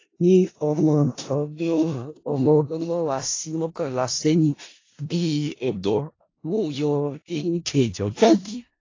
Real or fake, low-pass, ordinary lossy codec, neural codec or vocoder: fake; 7.2 kHz; AAC, 32 kbps; codec, 16 kHz in and 24 kHz out, 0.4 kbps, LongCat-Audio-Codec, four codebook decoder